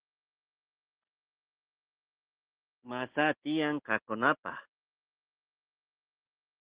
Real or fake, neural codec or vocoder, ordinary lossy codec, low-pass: real; none; Opus, 16 kbps; 3.6 kHz